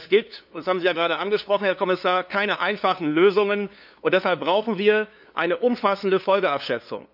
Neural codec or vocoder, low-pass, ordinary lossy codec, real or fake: codec, 16 kHz, 2 kbps, FunCodec, trained on LibriTTS, 25 frames a second; 5.4 kHz; none; fake